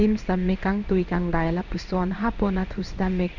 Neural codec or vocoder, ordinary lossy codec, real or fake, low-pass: codec, 16 kHz in and 24 kHz out, 1 kbps, XY-Tokenizer; none; fake; 7.2 kHz